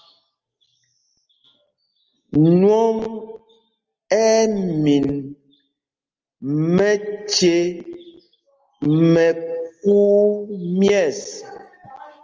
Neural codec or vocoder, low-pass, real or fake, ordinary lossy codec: none; 7.2 kHz; real; Opus, 24 kbps